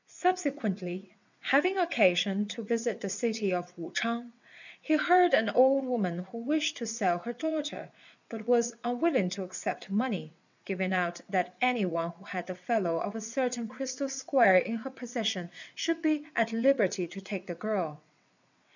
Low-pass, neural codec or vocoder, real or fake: 7.2 kHz; vocoder, 22.05 kHz, 80 mel bands, WaveNeXt; fake